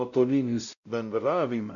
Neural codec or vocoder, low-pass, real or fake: codec, 16 kHz, 0.5 kbps, X-Codec, WavLM features, trained on Multilingual LibriSpeech; 7.2 kHz; fake